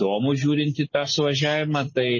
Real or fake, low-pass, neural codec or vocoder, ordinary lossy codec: real; 7.2 kHz; none; MP3, 32 kbps